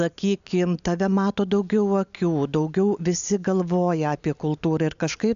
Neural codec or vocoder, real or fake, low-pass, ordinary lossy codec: none; real; 7.2 kHz; AAC, 96 kbps